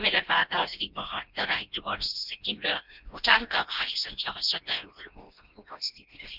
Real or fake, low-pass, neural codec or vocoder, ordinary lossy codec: fake; 5.4 kHz; codec, 16 kHz, 1 kbps, FreqCodec, smaller model; Opus, 32 kbps